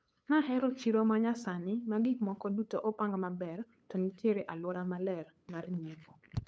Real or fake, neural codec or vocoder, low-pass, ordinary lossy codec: fake; codec, 16 kHz, 8 kbps, FunCodec, trained on LibriTTS, 25 frames a second; none; none